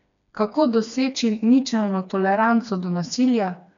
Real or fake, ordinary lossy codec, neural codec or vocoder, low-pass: fake; none; codec, 16 kHz, 2 kbps, FreqCodec, smaller model; 7.2 kHz